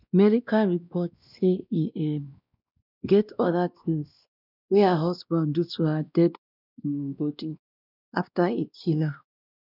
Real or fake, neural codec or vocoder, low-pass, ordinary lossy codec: fake; codec, 16 kHz, 1 kbps, X-Codec, WavLM features, trained on Multilingual LibriSpeech; 5.4 kHz; none